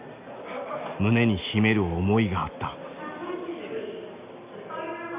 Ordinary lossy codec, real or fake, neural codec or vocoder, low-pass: Opus, 64 kbps; real; none; 3.6 kHz